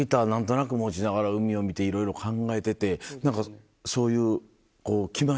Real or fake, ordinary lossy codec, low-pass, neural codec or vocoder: real; none; none; none